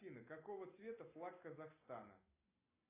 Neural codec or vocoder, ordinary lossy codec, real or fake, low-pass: none; AAC, 24 kbps; real; 3.6 kHz